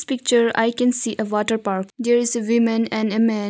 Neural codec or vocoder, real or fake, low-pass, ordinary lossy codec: none; real; none; none